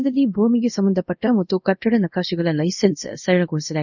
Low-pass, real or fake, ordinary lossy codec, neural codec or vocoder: 7.2 kHz; fake; none; codec, 24 kHz, 0.5 kbps, DualCodec